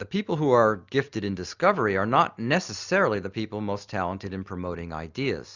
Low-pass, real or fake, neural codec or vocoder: 7.2 kHz; real; none